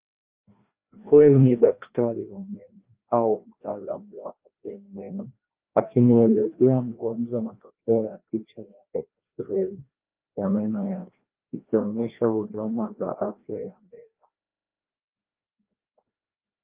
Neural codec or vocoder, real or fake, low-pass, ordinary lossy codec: codec, 16 kHz, 1 kbps, FreqCodec, larger model; fake; 3.6 kHz; Opus, 32 kbps